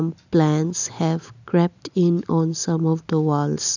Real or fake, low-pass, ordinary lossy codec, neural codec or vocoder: real; 7.2 kHz; none; none